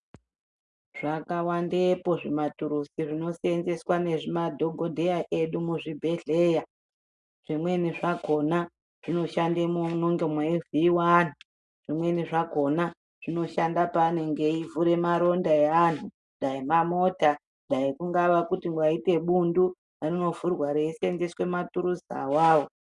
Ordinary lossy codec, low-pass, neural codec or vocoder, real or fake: MP3, 96 kbps; 10.8 kHz; none; real